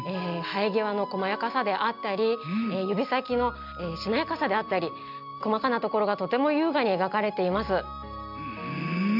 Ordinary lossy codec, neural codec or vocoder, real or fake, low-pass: AAC, 48 kbps; none; real; 5.4 kHz